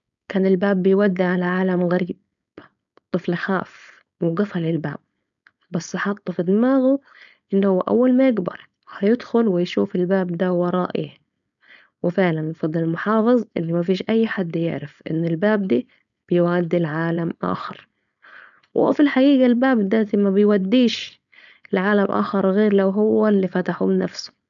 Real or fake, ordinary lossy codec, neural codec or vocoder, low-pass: fake; none; codec, 16 kHz, 4.8 kbps, FACodec; 7.2 kHz